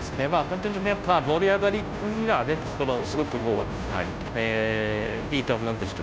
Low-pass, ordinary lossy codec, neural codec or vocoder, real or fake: none; none; codec, 16 kHz, 0.5 kbps, FunCodec, trained on Chinese and English, 25 frames a second; fake